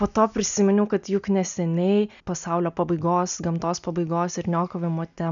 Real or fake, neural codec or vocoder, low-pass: real; none; 7.2 kHz